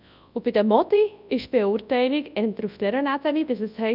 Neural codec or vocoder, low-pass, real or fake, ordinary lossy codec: codec, 24 kHz, 0.9 kbps, WavTokenizer, large speech release; 5.4 kHz; fake; none